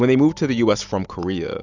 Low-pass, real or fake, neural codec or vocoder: 7.2 kHz; real; none